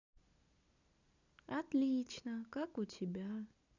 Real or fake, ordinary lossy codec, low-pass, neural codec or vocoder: real; none; 7.2 kHz; none